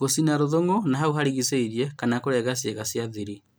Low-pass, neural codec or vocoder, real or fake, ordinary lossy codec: none; none; real; none